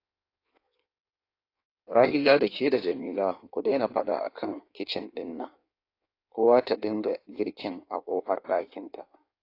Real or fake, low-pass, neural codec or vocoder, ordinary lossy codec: fake; 5.4 kHz; codec, 16 kHz in and 24 kHz out, 1.1 kbps, FireRedTTS-2 codec; AAC, 32 kbps